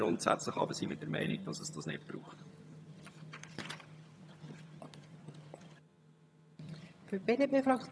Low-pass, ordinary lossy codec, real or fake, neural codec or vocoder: none; none; fake; vocoder, 22.05 kHz, 80 mel bands, HiFi-GAN